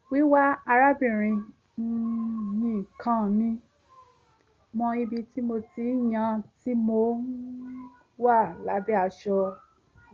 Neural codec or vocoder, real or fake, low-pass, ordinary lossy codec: none; real; 7.2 kHz; Opus, 32 kbps